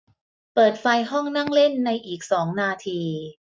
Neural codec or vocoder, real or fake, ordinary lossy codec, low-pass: none; real; none; none